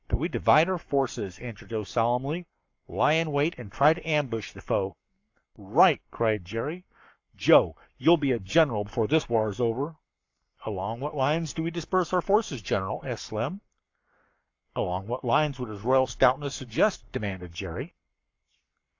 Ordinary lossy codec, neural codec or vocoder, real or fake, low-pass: AAC, 48 kbps; codec, 44.1 kHz, 7.8 kbps, Pupu-Codec; fake; 7.2 kHz